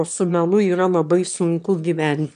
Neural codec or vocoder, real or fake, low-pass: autoencoder, 22.05 kHz, a latent of 192 numbers a frame, VITS, trained on one speaker; fake; 9.9 kHz